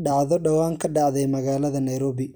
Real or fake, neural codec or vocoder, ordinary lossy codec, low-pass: real; none; none; none